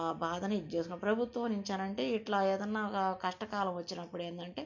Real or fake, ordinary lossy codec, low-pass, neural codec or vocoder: real; MP3, 48 kbps; 7.2 kHz; none